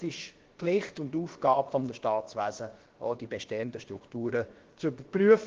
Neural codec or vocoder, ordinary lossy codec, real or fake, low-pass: codec, 16 kHz, about 1 kbps, DyCAST, with the encoder's durations; Opus, 16 kbps; fake; 7.2 kHz